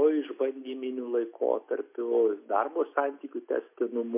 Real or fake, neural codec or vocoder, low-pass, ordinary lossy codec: real; none; 3.6 kHz; MP3, 24 kbps